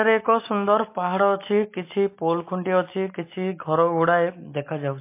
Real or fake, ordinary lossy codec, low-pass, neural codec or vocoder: real; MP3, 24 kbps; 3.6 kHz; none